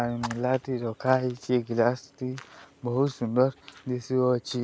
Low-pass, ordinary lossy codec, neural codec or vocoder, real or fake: none; none; none; real